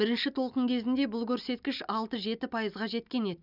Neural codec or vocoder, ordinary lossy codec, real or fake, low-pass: none; none; real; 5.4 kHz